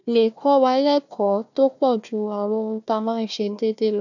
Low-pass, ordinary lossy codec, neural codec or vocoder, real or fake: 7.2 kHz; none; codec, 16 kHz, 1 kbps, FunCodec, trained on Chinese and English, 50 frames a second; fake